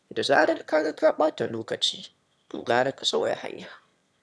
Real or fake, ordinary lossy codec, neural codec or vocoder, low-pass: fake; none; autoencoder, 22.05 kHz, a latent of 192 numbers a frame, VITS, trained on one speaker; none